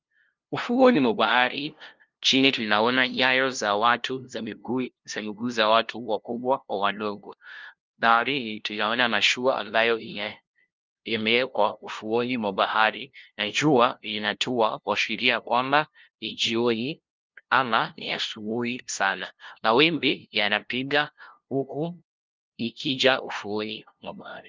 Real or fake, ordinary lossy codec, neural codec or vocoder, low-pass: fake; Opus, 24 kbps; codec, 16 kHz, 0.5 kbps, FunCodec, trained on LibriTTS, 25 frames a second; 7.2 kHz